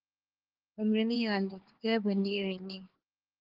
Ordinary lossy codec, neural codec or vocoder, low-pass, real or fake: Opus, 16 kbps; codec, 16 kHz, 4 kbps, X-Codec, HuBERT features, trained on LibriSpeech; 5.4 kHz; fake